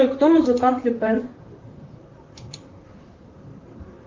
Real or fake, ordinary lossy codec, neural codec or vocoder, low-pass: fake; Opus, 24 kbps; vocoder, 44.1 kHz, 128 mel bands, Pupu-Vocoder; 7.2 kHz